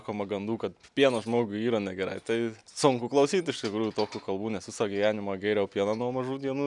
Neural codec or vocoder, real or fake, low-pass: none; real; 10.8 kHz